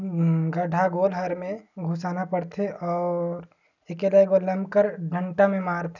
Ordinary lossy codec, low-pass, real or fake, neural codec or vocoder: none; 7.2 kHz; real; none